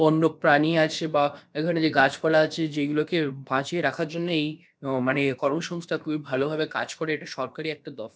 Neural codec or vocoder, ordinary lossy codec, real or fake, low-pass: codec, 16 kHz, about 1 kbps, DyCAST, with the encoder's durations; none; fake; none